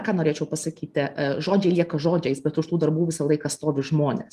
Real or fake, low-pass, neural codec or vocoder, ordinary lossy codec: real; 14.4 kHz; none; Opus, 32 kbps